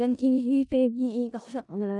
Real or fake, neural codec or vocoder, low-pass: fake; codec, 16 kHz in and 24 kHz out, 0.4 kbps, LongCat-Audio-Codec, four codebook decoder; 10.8 kHz